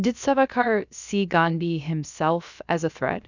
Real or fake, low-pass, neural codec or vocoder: fake; 7.2 kHz; codec, 16 kHz, about 1 kbps, DyCAST, with the encoder's durations